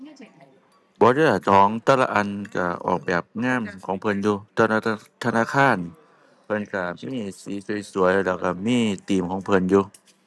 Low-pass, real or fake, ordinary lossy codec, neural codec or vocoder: none; real; none; none